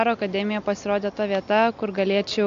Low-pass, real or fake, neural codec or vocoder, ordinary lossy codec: 7.2 kHz; real; none; AAC, 64 kbps